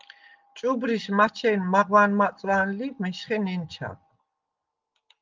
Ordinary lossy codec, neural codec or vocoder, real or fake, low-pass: Opus, 24 kbps; none; real; 7.2 kHz